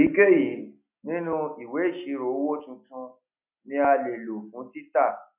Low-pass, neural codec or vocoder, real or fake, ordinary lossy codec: 3.6 kHz; none; real; none